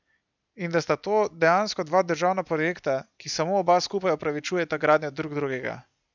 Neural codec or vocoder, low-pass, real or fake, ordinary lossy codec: none; 7.2 kHz; real; none